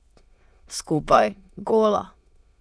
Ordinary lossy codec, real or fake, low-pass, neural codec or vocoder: none; fake; none; autoencoder, 22.05 kHz, a latent of 192 numbers a frame, VITS, trained on many speakers